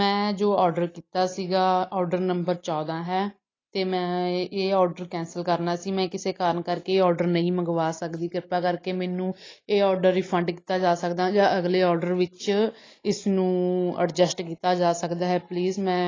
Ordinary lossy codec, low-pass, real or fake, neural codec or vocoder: AAC, 32 kbps; 7.2 kHz; real; none